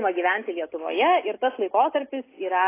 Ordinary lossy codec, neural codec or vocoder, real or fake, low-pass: AAC, 24 kbps; none; real; 3.6 kHz